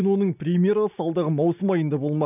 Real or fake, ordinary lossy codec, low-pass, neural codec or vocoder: real; none; 3.6 kHz; none